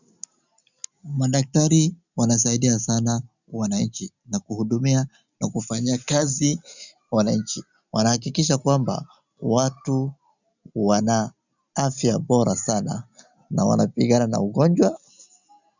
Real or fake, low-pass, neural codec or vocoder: real; 7.2 kHz; none